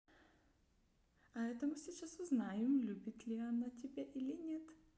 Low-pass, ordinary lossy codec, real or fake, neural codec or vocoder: none; none; real; none